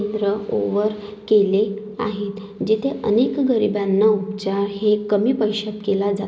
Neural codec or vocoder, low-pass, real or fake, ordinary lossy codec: none; none; real; none